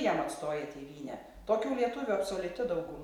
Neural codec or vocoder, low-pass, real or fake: none; 19.8 kHz; real